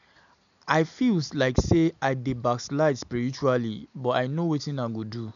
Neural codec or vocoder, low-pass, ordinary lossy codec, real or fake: none; 7.2 kHz; none; real